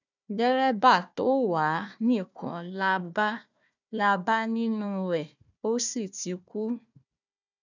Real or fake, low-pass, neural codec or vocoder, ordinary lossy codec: fake; 7.2 kHz; codec, 16 kHz, 1 kbps, FunCodec, trained on Chinese and English, 50 frames a second; none